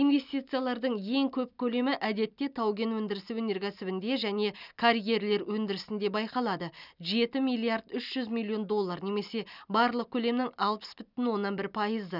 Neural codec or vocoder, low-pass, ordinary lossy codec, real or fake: none; 5.4 kHz; none; real